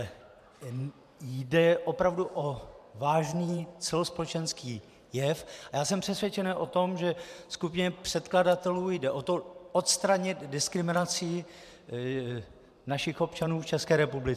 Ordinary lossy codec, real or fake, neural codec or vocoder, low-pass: MP3, 96 kbps; fake; vocoder, 44.1 kHz, 128 mel bands every 512 samples, BigVGAN v2; 14.4 kHz